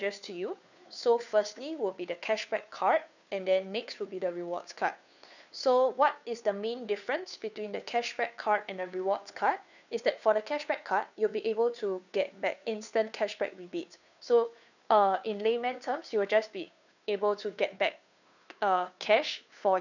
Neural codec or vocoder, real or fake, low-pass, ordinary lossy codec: codec, 16 kHz, 2 kbps, FunCodec, trained on Chinese and English, 25 frames a second; fake; 7.2 kHz; none